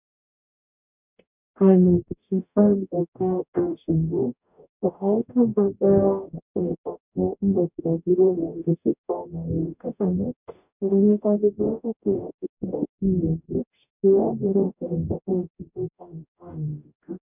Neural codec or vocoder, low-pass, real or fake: codec, 44.1 kHz, 0.9 kbps, DAC; 3.6 kHz; fake